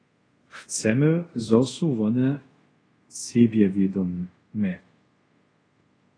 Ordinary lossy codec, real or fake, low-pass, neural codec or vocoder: AAC, 32 kbps; fake; 9.9 kHz; codec, 24 kHz, 0.5 kbps, DualCodec